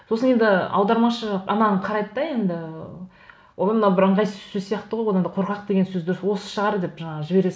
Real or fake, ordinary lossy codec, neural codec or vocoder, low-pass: real; none; none; none